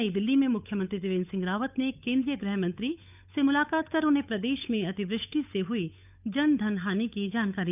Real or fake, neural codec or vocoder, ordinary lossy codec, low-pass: fake; codec, 16 kHz, 8 kbps, FunCodec, trained on Chinese and English, 25 frames a second; none; 3.6 kHz